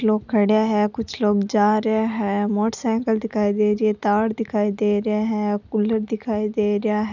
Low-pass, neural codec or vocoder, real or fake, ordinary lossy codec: 7.2 kHz; none; real; none